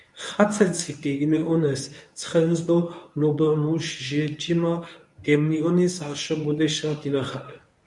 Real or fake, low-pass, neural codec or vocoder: fake; 10.8 kHz; codec, 24 kHz, 0.9 kbps, WavTokenizer, medium speech release version 1